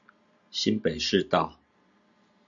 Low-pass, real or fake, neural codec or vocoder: 7.2 kHz; real; none